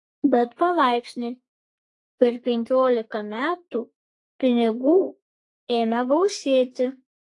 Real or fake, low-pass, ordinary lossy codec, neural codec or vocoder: fake; 10.8 kHz; AAC, 48 kbps; codec, 32 kHz, 1.9 kbps, SNAC